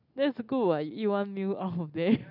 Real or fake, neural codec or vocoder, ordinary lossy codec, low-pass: real; none; none; 5.4 kHz